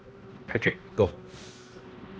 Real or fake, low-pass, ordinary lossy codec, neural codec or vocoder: fake; none; none; codec, 16 kHz, 1 kbps, X-Codec, HuBERT features, trained on balanced general audio